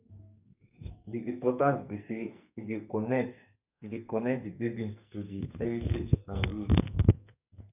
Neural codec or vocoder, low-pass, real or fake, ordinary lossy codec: codec, 44.1 kHz, 2.6 kbps, SNAC; 3.6 kHz; fake; none